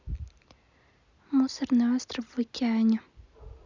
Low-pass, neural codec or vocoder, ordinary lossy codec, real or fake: 7.2 kHz; none; Opus, 64 kbps; real